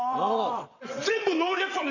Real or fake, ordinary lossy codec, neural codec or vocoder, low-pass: fake; none; vocoder, 22.05 kHz, 80 mel bands, WaveNeXt; 7.2 kHz